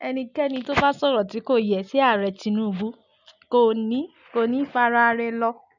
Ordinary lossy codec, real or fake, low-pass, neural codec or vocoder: none; real; 7.2 kHz; none